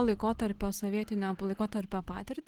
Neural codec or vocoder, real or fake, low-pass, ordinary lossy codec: none; real; 19.8 kHz; Opus, 16 kbps